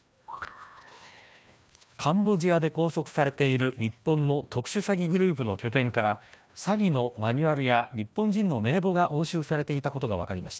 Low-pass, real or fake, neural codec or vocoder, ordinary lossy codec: none; fake; codec, 16 kHz, 1 kbps, FreqCodec, larger model; none